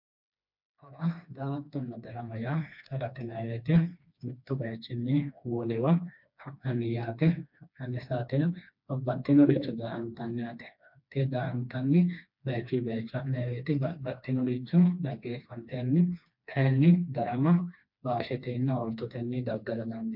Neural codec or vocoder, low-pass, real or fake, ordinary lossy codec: codec, 16 kHz, 2 kbps, FreqCodec, smaller model; 5.4 kHz; fake; MP3, 48 kbps